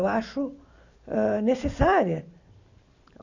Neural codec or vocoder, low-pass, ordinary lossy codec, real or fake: none; 7.2 kHz; none; real